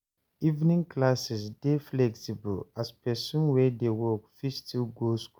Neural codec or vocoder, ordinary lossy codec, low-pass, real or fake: none; none; none; real